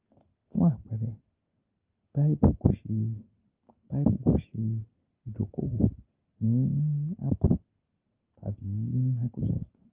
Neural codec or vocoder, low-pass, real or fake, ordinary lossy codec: none; 3.6 kHz; real; Opus, 32 kbps